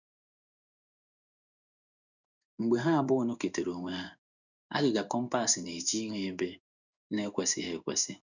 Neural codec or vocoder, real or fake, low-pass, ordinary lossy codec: codec, 16 kHz in and 24 kHz out, 1 kbps, XY-Tokenizer; fake; 7.2 kHz; none